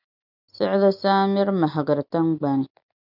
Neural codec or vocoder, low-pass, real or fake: none; 5.4 kHz; real